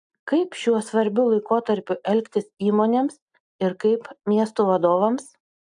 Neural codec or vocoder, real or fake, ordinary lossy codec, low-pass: none; real; AAC, 64 kbps; 9.9 kHz